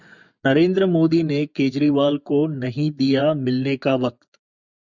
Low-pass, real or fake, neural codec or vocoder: 7.2 kHz; fake; vocoder, 24 kHz, 100 mel bands, Vocos